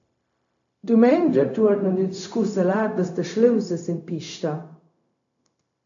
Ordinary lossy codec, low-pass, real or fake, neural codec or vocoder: AAC, 64 kbps; 7.2 kHz; fake; codec, 16 kHz, 0.4 kbps, LongCat-Audio-Codec